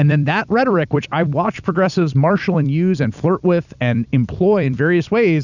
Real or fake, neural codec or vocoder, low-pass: fake; vocoder, 44.1 kHz, 128 mel bands every 256 samples, BigVGAN v2; 7.2 kHz